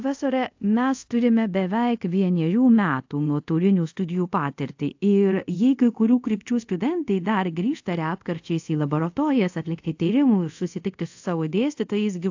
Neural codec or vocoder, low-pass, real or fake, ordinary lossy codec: codec, 24 kHz, 0.5 kbps, DualCodec; 7.2 kHz; fake; AAC, 48 kbps